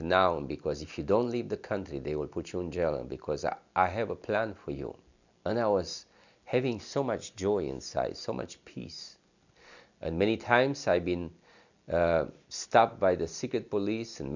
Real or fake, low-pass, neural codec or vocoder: real; 7.2 kHz; none